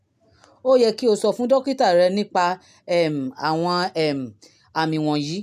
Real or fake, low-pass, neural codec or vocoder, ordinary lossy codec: real; 14.4 kHz; none; none